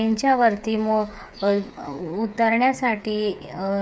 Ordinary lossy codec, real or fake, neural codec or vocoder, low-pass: none; fake; codec, 16 kHz, 8 kbps, FreqCodec, smaller model; none